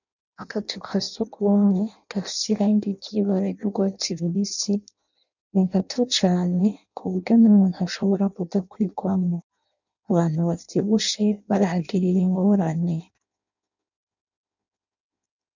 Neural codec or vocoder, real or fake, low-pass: codec, 16 kHz in and 24 kHz out, 0.6 kbps, FireRedTTS-2 codec; fake; 7.2 kHz